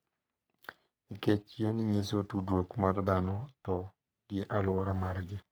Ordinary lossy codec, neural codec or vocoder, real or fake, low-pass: none; codec, 44.1 kHz, 3.4 kbps, Pupu-Codec; fake; none